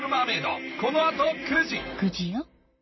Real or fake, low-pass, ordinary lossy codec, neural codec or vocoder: fake; 7.2 kHz; MP3, 24 kbps; vocoder, 44.1 kHz, 128 mel bands, Pupu-Vocoder